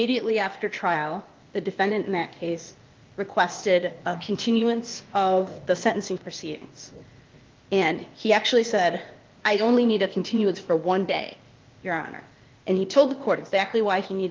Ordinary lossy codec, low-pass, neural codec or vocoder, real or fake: Opus, 32 kbps; 7.2 kHz; codec, 16 kHz, 0.8 kbps, ZipCodec; fake